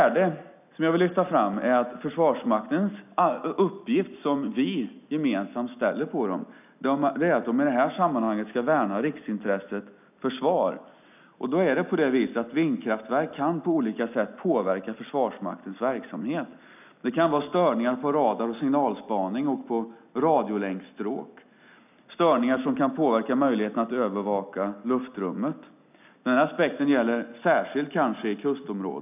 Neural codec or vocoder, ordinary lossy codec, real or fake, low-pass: none; none; real; 3.6 kHz